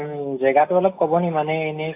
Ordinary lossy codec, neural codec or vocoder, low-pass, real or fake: AAC, 24 kbps; none; 3.6 kHz; real